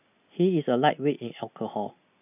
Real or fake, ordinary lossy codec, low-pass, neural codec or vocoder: real; none; 3.6 kHz; none